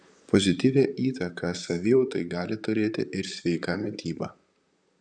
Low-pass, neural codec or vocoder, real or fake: 9.9 kHz; vocoder, 44.1 kHz, 128 mel bands, Pupu-Vocoder; fake